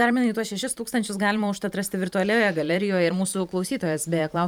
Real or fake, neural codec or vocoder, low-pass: fake; vocoder, 44.1 kHz, 128 mel bands every 512 samples, BigVGAN v2; 19.8 kHz